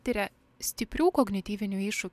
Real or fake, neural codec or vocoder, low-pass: real; none; 14.4 kHz